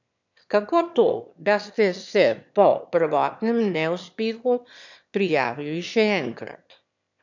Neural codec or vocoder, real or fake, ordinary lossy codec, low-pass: autoencoder, 22.05 kHz, a latent of 192 numbers a frame, VITS, trained on one speaker; fake; none; 7.2 kHz